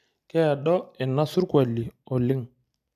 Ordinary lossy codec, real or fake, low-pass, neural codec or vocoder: MP3, 96 kbps; real; 14.4 kHz; none